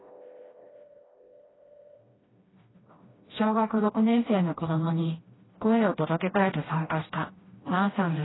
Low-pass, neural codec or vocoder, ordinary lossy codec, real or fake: 7.2 kHz; codec, 16 kHz, 1 kbps, FreqCodec, smaller model; AAC, 16 kbps; fake